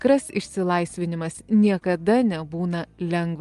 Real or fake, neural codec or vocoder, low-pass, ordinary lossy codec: real; none; 10.8 kHz; Opus, 32 kbps